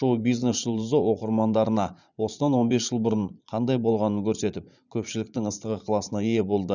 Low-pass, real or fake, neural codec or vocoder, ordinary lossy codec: 7.2 kHz; fake; vocoder, 44.1 kHz, 80 mel bands, Vocos; none